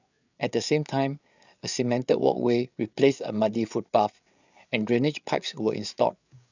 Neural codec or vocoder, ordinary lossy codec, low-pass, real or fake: codec, 16 kHz, 8 kbps, FreqCodec, larger model; none; 7.2 kHz; fake